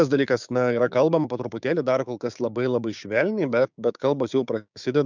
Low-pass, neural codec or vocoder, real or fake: 7.2 kHz; codec, 16 kHz, 6 kbps, DAC; fake